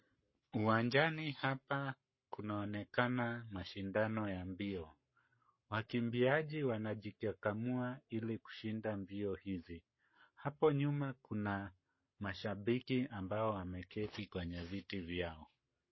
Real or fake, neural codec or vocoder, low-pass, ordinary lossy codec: fake; codec, 44.1 kHz, 7.8 kbps, Pupu-Codec; 7.2 kHz; MP3, 24 kbps